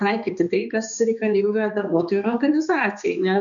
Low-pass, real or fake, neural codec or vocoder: 7.2 kHz; fake; codec, 16 kHz, 2 kbps, X-Codec, HuBERT features, trained on balanced general audio